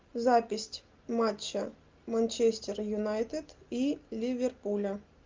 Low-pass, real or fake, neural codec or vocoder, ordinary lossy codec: 7.2 kHz; real; none; Opus, 32 kbps